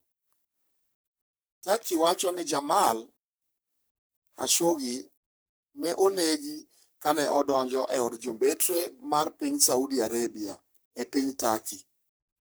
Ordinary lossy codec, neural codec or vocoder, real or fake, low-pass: none; codec, 44.1 kHz, 3.4 kbps, Pupu-Codec; fake; none